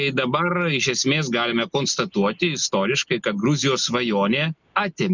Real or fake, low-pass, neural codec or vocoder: real; 7.2 kHz; none